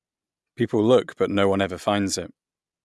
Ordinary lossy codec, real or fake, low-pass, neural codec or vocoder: none; real; none; none